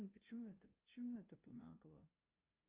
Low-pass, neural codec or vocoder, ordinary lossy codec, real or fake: 3.6 kHz; codec, 16 kHz, 0.5 kbps, FunCodec, trained on LibriTTS, 25 frames a second; Opus, 24 kbps; fake